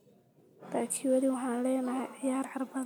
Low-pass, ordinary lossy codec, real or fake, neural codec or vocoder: none; none; real; none